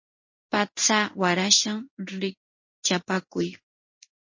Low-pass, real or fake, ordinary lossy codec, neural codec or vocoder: 7.2 kHz; fake; MP3, 32 kbps; codec, 16 kHz in and 24 kHz out, 1 kbps, XY-Tokenizer